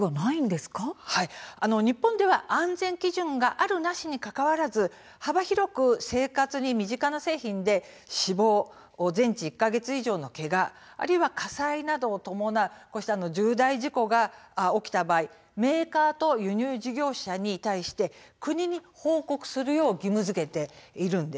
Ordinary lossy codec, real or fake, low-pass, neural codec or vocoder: none; real; none; none